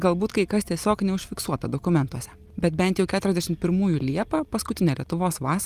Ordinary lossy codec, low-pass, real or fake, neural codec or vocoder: Opus, 32 kbps; 14.4 kHz; real; none